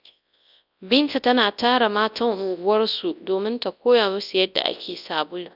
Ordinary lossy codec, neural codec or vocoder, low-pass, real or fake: none; codec, 24 kHz, 0.9 kbps, WavTokenizer, large speech release; 5.4 kHz; fake